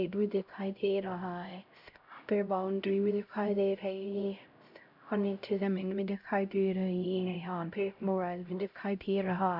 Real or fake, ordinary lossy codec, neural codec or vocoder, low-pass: fake; none; codec, 16 kHz, 0.5 kbps, X-Codec, HuBERT features, trained on LibriSpeech; 5.4 kHz